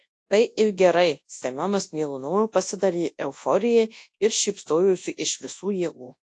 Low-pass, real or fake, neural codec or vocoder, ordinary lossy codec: 10.8 kHz; fake; codec, 24 kHz, 0.9 kbps, WavTokenizer, large speech release; AAC, 48 kbps